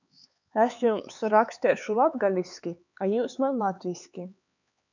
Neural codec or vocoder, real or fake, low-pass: codec, 16 kHz, 4 kbps, X-Codec, HuBERT features, trained on LibriSpeech; fake; 7.2 kHz